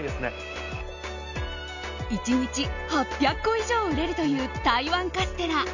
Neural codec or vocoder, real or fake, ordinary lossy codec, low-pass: none; real; none; 7.2 kHz